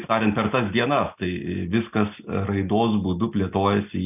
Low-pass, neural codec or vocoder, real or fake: 3.6 kHz; none; real